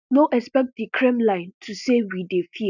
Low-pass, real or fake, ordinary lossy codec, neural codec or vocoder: 7.2 kHz; real; none; none